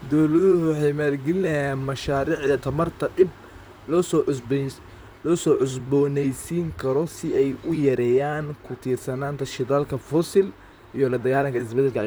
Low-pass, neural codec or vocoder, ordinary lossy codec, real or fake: none; vocoder, 44.1 kHz, 128 mel bands, Pupu-Vocoder; none; fake